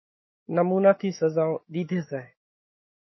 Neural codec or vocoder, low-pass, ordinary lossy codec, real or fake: autoencoder, 48 kHz, 128 numbers a frame, DAC-VAE, trained on Japanese speech; 7.2 kHz; MP3, 24 kbps; fake